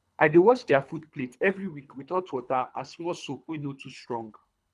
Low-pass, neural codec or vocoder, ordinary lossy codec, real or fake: none; codec, 24 kHz, 3 kbps, HILCodec; none; fake